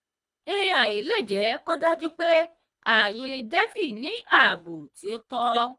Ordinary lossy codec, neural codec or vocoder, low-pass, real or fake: none; codec, 24 kHz, 1.5 kbps, HILCodec; none; fake